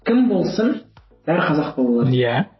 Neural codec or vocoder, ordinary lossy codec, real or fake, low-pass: none; MP3, 24 kbps; real; 7.2 kHz